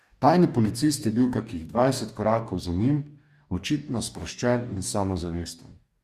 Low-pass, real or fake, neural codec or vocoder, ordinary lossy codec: 14.4 kHz; fake; codec, 44.1 kHz, 2.6 kbps, DAC; Opus, 64 kbps